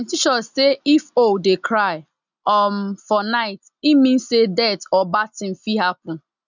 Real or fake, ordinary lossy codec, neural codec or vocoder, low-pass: real; none; none; 7.2 kHz